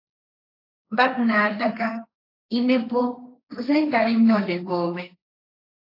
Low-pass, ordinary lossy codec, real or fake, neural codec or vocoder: 5.4 kHz; AAC, 32 kbps; fake; codec, 16 kHz, 1.1 kbps, Voila-Tokenizer